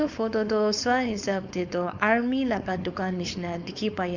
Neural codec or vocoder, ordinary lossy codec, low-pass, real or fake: codec, 16 kHz, 4.8 kbps, FACodec; none; 7.2 kHz; fake